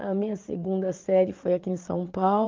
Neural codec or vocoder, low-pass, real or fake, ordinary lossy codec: vocoder, 22.05 kHz, 80 mel bands, Vocos; 7.2 kHz; fake; Opus, 32 kbps